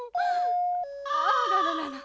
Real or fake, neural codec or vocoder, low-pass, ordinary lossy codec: real; none; none; none